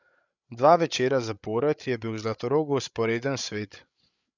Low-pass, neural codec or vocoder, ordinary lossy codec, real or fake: 7.2 kHz; codec, 16 kHz, 8 kbps, FreqCodec, larger model; none; fake